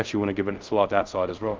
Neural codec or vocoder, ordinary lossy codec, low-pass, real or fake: codec, 24 kHz, 0.5 kbps, DualCodec; Opus, 24 kbps; 7.2 kHz; fake